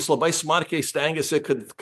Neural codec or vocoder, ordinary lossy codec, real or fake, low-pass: vocoder, 44.1 kHz, 128 mel bands every 512 samples, BigVGAN v2; MP3, 96 kbps; fake; 14.4 kHz